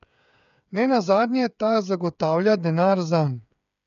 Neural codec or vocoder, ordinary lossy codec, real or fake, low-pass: codec, 16 kHz, 8 kbps, FreqCodec, smaller model; MP3, 96 kbps; fake; 7.2 kHz